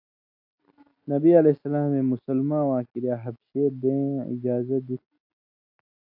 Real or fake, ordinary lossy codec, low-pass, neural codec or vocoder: real; AAC, 48 kbps; 5.4 kHz; none